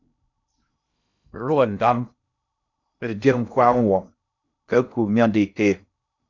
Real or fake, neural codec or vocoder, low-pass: fake; codec, 16 kHz in and 24 kHz out, 0.6 kbps, FocalCodec, streaming, 2048 codes; 7.2 kHz